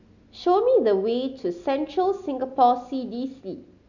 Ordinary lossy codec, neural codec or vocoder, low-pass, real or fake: none; none; 7.2 kHz; real